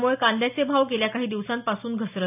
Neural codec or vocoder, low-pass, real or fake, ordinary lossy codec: none; 3.6 kHz; real; none